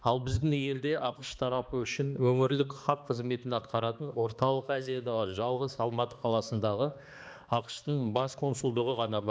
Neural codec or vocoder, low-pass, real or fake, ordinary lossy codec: codec, 16 kHz, 2 kbps, X-Codec, HuBERT features, trained on balanced general audio; none; fake; none